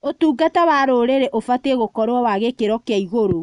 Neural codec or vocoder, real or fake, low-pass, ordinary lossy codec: none; real; 10.8 kHz; none